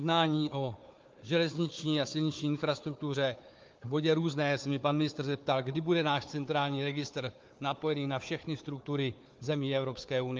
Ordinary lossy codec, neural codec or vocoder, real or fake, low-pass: Opus, 24 kbps; codec, 16 kHz, 4 kbps, FunCodec, trained on Chinese and English, 50 frames a second; fake; 7.2 kHz